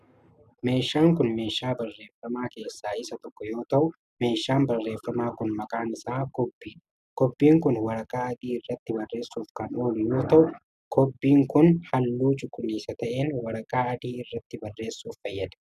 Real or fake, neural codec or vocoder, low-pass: real; none; 14.4 kHz